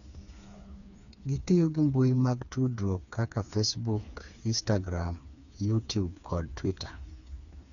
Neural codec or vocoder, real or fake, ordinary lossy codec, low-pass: codec, 16 kHz, 4 kbps, FreqCodec, smaller model; fake; none; 7.2 kHz